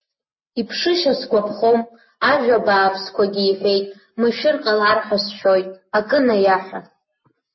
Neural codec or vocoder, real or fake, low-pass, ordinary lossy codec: none; real; 7.2 kHz; MP3, 24 kbps